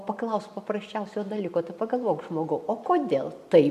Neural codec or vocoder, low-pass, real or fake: none; 14.4 kHz; real